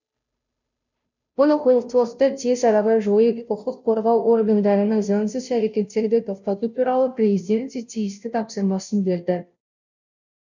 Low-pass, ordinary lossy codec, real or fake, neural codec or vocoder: 7.2 kHz; none; fake; codec, 16 kHz, 0.5 kbps, FunCodec, trained on Chinese and English, 25 frames a second